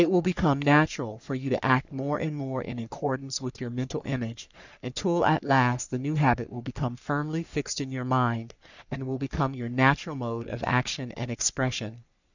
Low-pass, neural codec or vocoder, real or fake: 7.2 kHz; codec, 44.1 kHz, 3.4 kbps, Pupu-Codec; fake